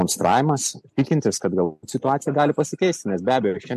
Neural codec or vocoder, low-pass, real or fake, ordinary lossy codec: none; 14.4 kHz; real; MP3, 64 kbps